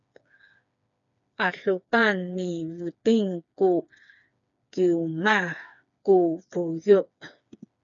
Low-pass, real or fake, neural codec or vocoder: 7.2 kHz; fake; codec, 16 kHz, 4 kbps, FreqCodec, smaller model